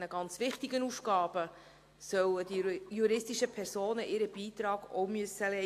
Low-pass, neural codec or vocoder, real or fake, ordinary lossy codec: 14.4 kHz; none; real; AAC, 64 kbps